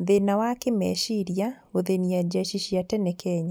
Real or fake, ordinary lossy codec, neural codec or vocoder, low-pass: real; none; none; none